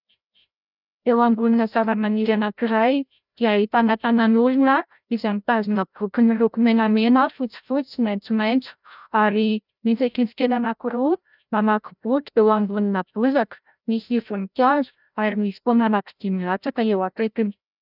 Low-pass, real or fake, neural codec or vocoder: 5.4 kHz; fake; codec, 16 kHz, 0.5 kbps, FreqCodec, larger model